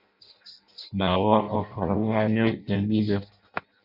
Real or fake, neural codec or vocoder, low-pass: fake; codec, 16 kHz in and 24 kHz out, 0.6 kbps, FireRedTTS-2 codec; 5.4 kHz